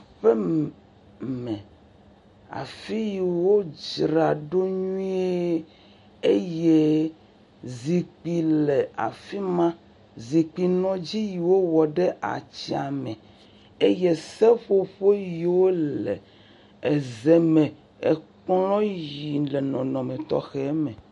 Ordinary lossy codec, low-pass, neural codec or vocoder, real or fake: MP3, 48 kbps; 14.4 kHz; none; real